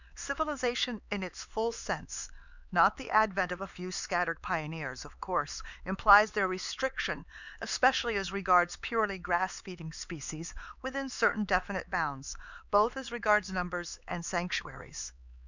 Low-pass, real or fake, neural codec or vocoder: 7.2 kHz; fake; codec, 16 kHz, 4 kbps, X-Codec, HuBERT features, trained on LibriSpeech